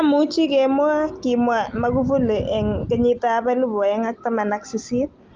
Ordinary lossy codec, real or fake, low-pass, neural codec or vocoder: Opus, 32 kbps; real; 7.2 kHz; none